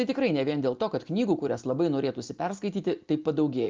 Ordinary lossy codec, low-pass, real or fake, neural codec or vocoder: Opus, 24 kbps; 7.2 kHz; real; none